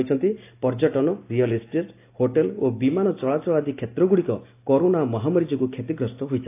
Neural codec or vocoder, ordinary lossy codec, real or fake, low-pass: none; AAC, 24 kbps; real; 3.6 kHz